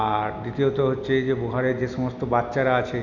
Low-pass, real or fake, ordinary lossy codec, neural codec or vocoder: 7.2 kHz; real; none; none